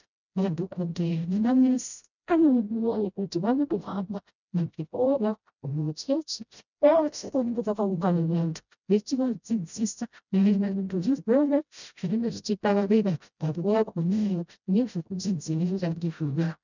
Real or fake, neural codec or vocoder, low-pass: fake; codec, 16 kHz, 0.5 kbps, FreqCodec, smaller model; 7.2 kHz